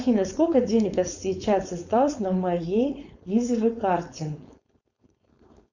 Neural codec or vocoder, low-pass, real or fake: codec, 16 kHz, 4.8 kbps, FACodec; 7.2 kHz; fake